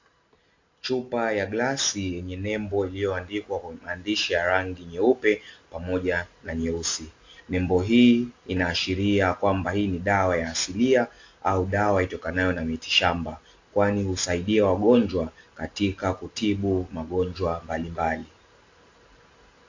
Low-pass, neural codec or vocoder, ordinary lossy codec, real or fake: 7.2 kHz; none; AAC, 48 kbps; real